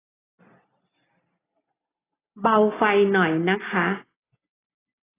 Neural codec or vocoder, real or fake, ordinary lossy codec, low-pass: none; real; AAC, 16 kbps; 3.6 kHz